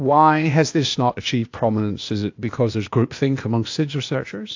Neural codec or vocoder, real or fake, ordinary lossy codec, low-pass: codec, 16 kHz, 0.8 kbps, ZipCodec; fake; AAC, 48 kbps; 7.2 kHz